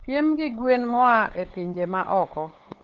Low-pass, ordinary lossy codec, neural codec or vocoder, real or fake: 7.2 kHz; Opus, 16 kbps; codec, 16 kHz, 8 kbps, FunCodec, trained on LibriTTS, 25 frames a second; fake